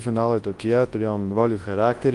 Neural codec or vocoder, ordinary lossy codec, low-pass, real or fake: codec, 24 kHz, 0.9 kbps, WavTokenizer, large speech release; Opus, 24 kbps; 10.8 kHz; fake